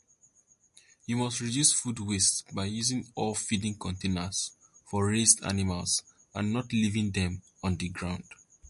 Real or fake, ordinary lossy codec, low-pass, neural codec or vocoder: real; MP3, 48 kbps; 14.4 kHz; none